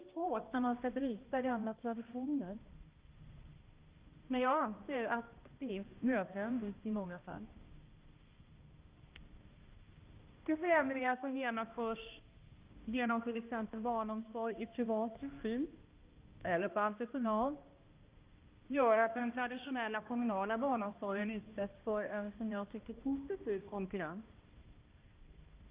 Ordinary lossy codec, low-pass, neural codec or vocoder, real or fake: Opus, 32 kbps; 3.6 kHz; codec, 16 kHz, 1 kbps, X-Codec, HuBERT features, trained on balanced general audio; fake